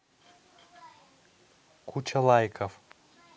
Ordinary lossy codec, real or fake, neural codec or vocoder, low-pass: none; real; none; none